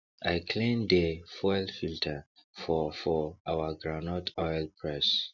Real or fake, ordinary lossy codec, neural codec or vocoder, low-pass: real; none; none; 7.2 kHz